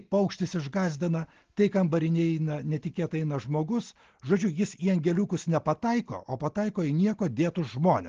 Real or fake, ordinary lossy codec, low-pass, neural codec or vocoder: real; Opus, 16 kbps; 7.2 kHz; none